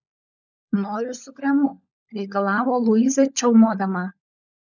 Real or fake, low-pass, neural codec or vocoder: fake; 7.2 kHz; codec, 16 kHz, 16 kbps, FunCodec, trained on LibriTTS, 50 frames a second